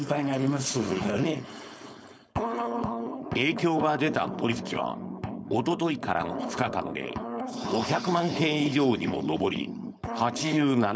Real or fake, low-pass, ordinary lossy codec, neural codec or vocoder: fake; none; none; codec, 16 kHz, 4.8 kbps, FACodec